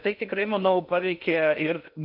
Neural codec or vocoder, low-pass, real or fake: codec, 16 kHz in and 24 kHz out, 0.8 kbps, FocalCodec, streaming, 65536 codes; 5.4 kHz; fake